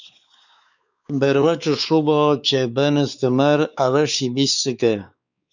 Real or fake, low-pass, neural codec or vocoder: fake; 7.2 kHz; codec, 16 kHz, 4 kbps, X-Codec, WavLM features, trained on Multilingual LibriSpeech